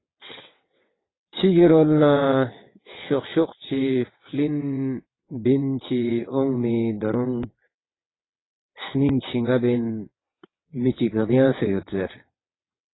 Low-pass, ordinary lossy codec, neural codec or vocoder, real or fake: 7.2 kHz; AAC, 16 kbps; vocoder, 22.05 kHz, 80 mel bands, WaveNeXt; fake